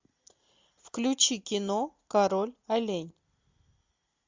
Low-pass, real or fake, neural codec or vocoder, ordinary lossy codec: 7.2 kHz; real; none; MP3, 64 kbps